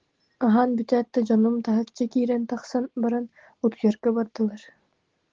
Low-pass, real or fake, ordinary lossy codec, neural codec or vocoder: 7.2 kHz; real; Opus, 16 kbps; none